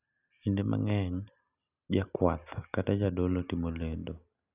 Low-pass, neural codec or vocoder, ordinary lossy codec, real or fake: 3.6 kHz; none; none; real